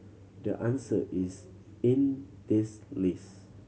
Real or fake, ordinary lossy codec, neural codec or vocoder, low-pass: real; none; none; none